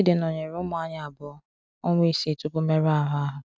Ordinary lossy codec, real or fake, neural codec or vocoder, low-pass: none; real; none; none